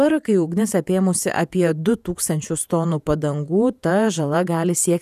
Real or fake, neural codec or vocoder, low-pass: fake; vocoder, 48 kHz, 128 mel bands, Vocos; 14.4 kHz